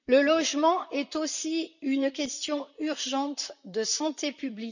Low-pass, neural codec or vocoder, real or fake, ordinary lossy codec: 7.2 kHz; vocoder, 44.1 kHz, 128 mel bands, Pupu-Vocoder; fake; none